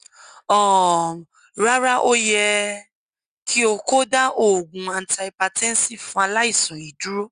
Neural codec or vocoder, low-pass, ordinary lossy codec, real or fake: none; 9.9 kHz; Opus, 64 kbps; real